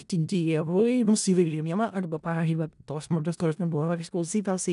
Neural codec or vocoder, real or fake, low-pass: codec, 16 kHz in and 24 kHz out, 0.4 kbps, LongCat-Audio-Codec, four codebook decoder; fake; 10.8 kHz